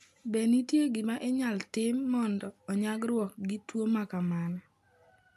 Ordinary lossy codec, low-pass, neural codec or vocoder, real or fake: MP3, 96 kbps; 14.4 kHz; none; real